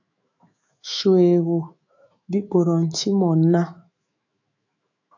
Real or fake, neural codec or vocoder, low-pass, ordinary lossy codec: fake; autoencoder, 48 kHz, 128 numbers a frame, DAC-VAE, trained on Japanese speech; 7.2 kHz; AAC, 48 kbps